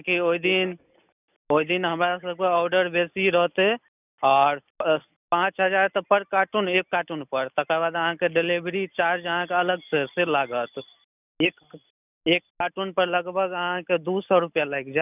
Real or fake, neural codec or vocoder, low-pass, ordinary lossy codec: real; none; 3.6 kHz; none